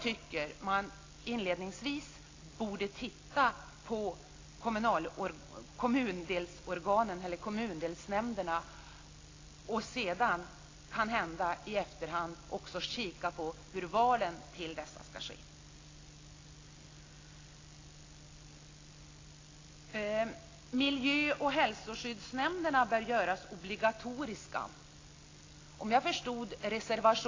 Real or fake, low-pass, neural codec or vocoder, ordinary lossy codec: real; 7.2 kHz; none; AAC, 32 kbps